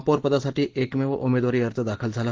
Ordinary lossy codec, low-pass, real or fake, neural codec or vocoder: Opus, 16 kbps; 7.2 kHz; real; none